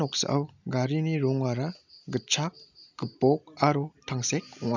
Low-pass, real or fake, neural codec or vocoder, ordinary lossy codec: 7.2 kHz; real; none; none